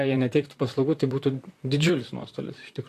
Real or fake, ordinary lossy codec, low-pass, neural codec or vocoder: fake; AAC, 64 kbps; 14.4 kHz; vocoder, 44.1 kHz, 128 mel bands, Pupu-Vocoder